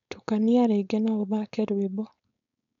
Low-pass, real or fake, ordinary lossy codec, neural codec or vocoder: 7.2 kHz; fake; none; codec, 16 kHz, 4.8 kbps, FACodec